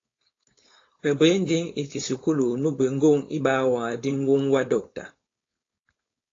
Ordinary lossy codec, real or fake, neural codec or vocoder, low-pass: AAC, 32 kbps; fake; codec, 16 kHz, 4.8 kbps, FACodec; 7.2 kHz